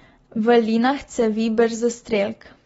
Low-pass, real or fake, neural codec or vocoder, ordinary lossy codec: 19.8 kHz; real; none; AAC, 24 kbps